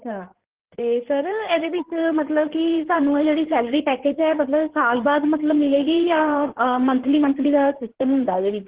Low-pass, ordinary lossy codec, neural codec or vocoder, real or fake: 3.6 kHz; Opus, 16 kbps; codec, 16 kHz in and 24 kHz out, 2.2 kbps, FireRedTTS-2 codec; fake